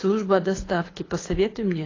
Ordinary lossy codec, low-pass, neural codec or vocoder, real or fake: AAC, 32 kbps; 7.2 kHz; codec, 24 kHz, 6 kbps, HILCodec; fake